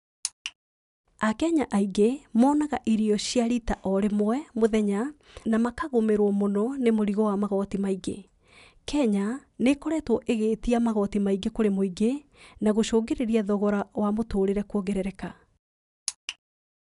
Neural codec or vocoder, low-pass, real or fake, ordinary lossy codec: none; 10.8 kHz; real; none